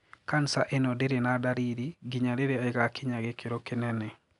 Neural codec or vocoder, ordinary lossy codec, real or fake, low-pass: none; none; real; 10.8 kHz